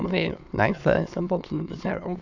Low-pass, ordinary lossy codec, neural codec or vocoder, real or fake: 7.2 kHz; none; autoencoder, 22.05 kHz, a latent of 192 numbers a frame, VITS, trained on many speakers; fake